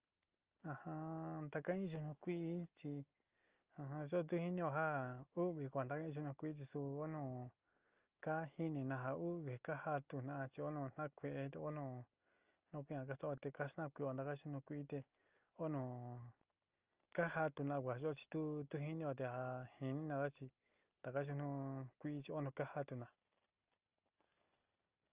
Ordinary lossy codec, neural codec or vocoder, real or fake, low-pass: Opus, 24 kbps; none; real; 3.6 kHz